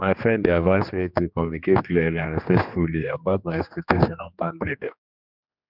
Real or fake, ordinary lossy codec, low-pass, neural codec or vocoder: fake; none; 5.4 kHz; codec, 16 kHz, 2 kbps, X-Codec, HuBERT features, trained on general audio